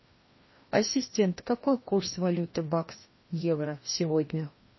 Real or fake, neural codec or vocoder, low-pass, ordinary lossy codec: fake; codec, 16 kHz, 1 kbps, FreqCodec, larger model; 7.2 kHz; MP3, 24 kbps